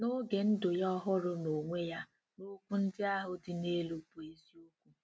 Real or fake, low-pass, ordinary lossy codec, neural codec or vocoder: real; none; none; none